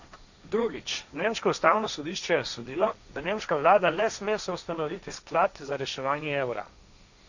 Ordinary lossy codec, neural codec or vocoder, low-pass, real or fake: none; codec, 16 kHz, 1.1 kbps, Voila-Tokenizer; 7.2 kHz; fake